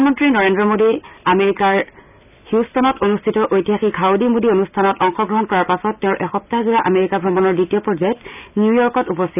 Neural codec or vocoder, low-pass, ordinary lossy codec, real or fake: none; 3.6 kHz; none; real